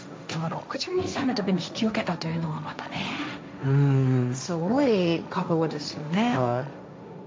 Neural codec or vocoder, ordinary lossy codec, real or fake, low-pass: codec, 16 kHz, 1.1 kbps, Voila-Tokenizer; none; fake; none